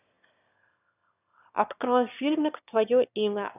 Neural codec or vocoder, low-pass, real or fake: autoencoder, 22.05 kHz, a latent of 192 numbers a frame, VITS, trained on one speaker; 3.6 kHz; fake